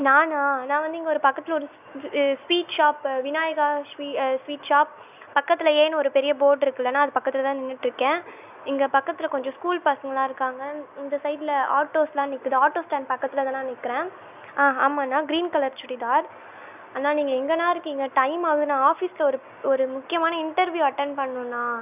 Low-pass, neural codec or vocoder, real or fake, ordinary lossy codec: 3.6 kHz; none; real; none